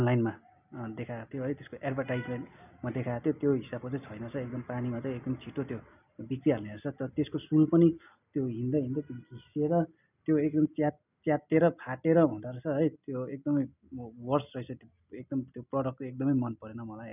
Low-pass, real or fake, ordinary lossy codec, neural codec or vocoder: 3.6 kHz; real; none; none